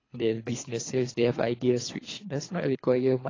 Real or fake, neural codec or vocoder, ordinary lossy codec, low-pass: fake; codec, 24 kHz, 3 kbps, HILCodec; AAC, 32 kbps; 7.2 kHz